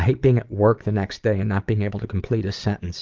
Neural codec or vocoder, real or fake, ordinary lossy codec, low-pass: vocoder, 22.05 kHz, 80 mel bands, Vocos; fake; Opus, 32 kbps; 7.2 kHz